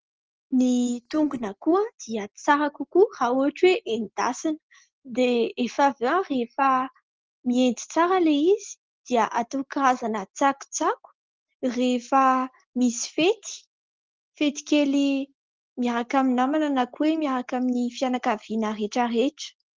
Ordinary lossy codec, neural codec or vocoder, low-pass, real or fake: Opus, 16 kbps; none; 7.2 kHz; real